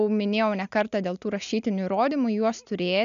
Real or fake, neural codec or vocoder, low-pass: real; none; 7.2 kHz